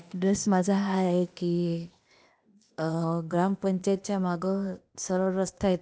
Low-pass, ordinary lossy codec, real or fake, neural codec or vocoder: none; none; fake; codec, 16 kHz, 0.8 kbps, ZipCodec